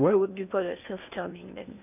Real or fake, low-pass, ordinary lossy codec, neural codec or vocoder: fake; 3.6 kHz; none; codec, 16 kHz in and 24 kHz out, 0.8 kbps, FocalCodec, streaming, 65536 codes